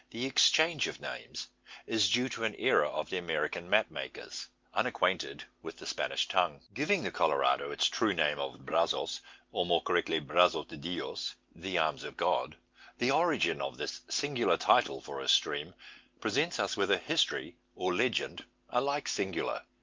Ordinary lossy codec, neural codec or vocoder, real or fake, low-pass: Opus, 32 kbps; none; real; 7.2 kHz